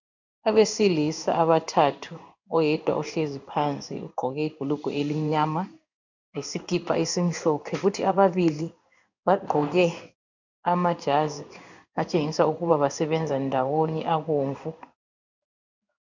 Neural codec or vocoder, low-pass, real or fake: codec, 16 kHz in and 24 kHz out, 1 kbps, XY-Tokenizer; 7.2 kHz; fake